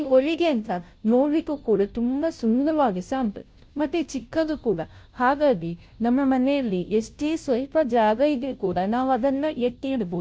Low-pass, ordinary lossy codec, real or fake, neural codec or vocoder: none; none; fake; codec, 16 kHz, 0.5 kbps, FunCodec, trained on Chinese and English, 25 frames a second